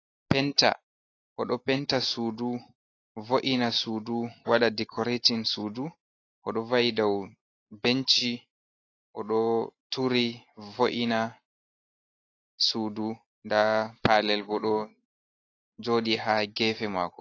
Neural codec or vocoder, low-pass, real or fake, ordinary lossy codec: none; 7.2 kHz; real; AAC, 32 kbps